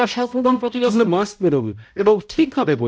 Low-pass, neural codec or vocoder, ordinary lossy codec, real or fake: none; codec, 16 kHz, 0.5 kbps, X-Codec, HuBERT features, trained on balanced general audio; none; fake